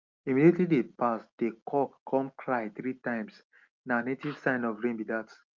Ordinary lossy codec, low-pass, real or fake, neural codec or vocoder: Opus, 24 kbps; 7.2 kHz; real; none